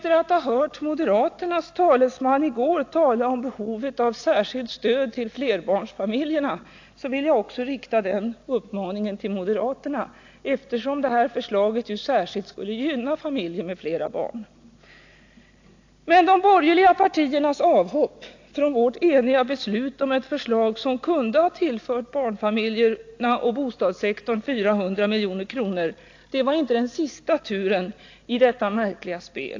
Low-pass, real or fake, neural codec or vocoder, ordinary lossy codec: 7.2 kHz; real; none; AAC, 48 kbps